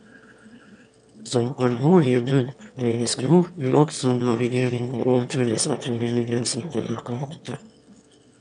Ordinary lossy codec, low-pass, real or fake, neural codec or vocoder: none; 9.9 kHz; fake; autoencoder, 22.05 kHz, a latent of 192 numbers a frame, VITS, trained on one speaker